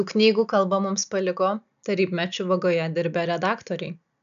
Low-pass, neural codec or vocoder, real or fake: 7.2 kHz; none; real